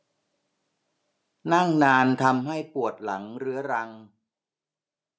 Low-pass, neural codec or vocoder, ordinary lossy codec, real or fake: none; none; none; real